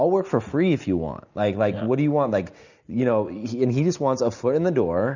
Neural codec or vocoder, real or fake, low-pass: none; real; 7.2 kHz